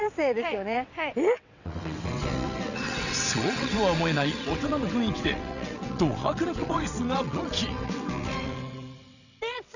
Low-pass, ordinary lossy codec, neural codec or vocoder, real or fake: 7.2 kHz; none; vocoder, 22.05 kHz, 80 mel bands, WaveNeXt; fake